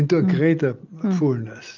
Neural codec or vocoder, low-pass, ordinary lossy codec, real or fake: none; 7.2 kHz; Opus, 24 kbps; real